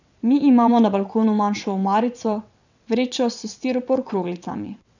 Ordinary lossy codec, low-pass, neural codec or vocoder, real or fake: none; 7.2 kHz; vocoder, 22.05 kHz, 80 mel bands, WaveNeXt; fake